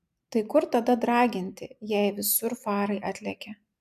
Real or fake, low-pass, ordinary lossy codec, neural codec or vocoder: real; 14.4 kHz; MP3, 96 kbps; none